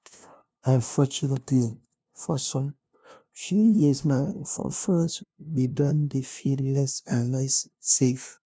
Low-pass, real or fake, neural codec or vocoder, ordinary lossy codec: none; fake; codec, 16 kHz, 0.5 kbps, FunCodec, trained on LibriTTS, 25 frames a second; none